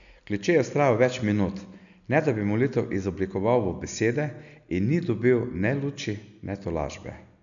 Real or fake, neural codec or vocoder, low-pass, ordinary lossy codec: real; none; 7.2 kHz; none